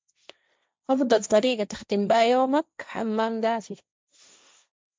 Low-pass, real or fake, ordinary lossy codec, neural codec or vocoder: none; fake; none; codec, 16 kHz, 1.1 kbps, Voila-Tokenizer